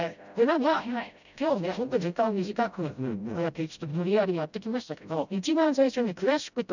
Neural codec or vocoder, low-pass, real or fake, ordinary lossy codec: codec, 16 kHz, 0.5 kbps, FreqCodec, smaller model; 7.2 kHz; fake; none